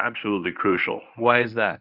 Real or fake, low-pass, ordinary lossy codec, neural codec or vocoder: fake; 5.4 kHz; Opus, 64 kbps; codec, 24 kHz, 0.9 kbps, WavTokenizer, medium speech release version 1